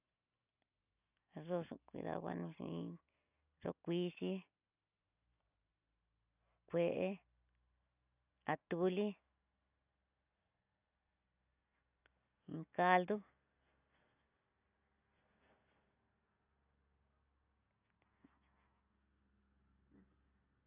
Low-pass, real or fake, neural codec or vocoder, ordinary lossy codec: 3.6 kHz; real; none; none